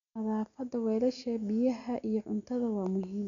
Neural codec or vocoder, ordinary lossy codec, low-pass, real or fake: none; none; 7.2 kHz; real